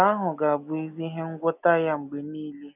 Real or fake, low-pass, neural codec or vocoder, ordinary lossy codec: real; 3.6 kHz; none; none